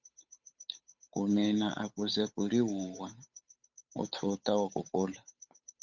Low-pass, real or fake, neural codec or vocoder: 7.2 kHz; fake; codec, 16 kHz, 8 kbps, FunCodec, trained on Chinese and English, 25 frames a second